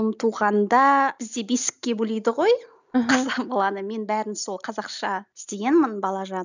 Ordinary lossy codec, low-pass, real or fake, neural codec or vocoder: none; 7.2 kHz; real; none